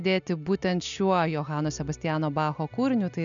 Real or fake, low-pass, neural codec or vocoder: real; 7.2 kHz; none